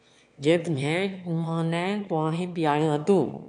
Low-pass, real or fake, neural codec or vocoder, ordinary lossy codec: 9.9 kHz; fake; autoencoder, 22.05 kHz, a latent of 192 numbers a frame, VITS, trained on one speaker; AAC, 64 kbps